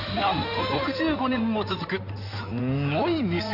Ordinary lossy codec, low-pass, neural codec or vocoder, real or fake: none; 5.4 kHz; codec, 16 kHz in and 24 kHz out, 2.2 kbps, FireRedTTS-2 codec; fake